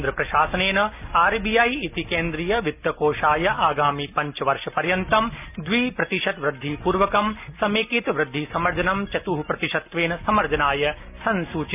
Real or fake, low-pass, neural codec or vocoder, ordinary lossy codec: real; 3.6 kHz; none; none